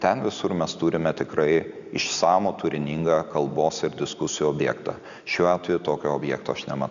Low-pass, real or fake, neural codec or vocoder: 7.2 kHz; real; none